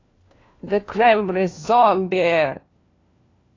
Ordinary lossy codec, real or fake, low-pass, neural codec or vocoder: AAC, 32 kbps; fake; 7.2 kHz; codec, 16 kHz, 1 kbps, FunCodec, trained on LibriTTS, 50 frames a second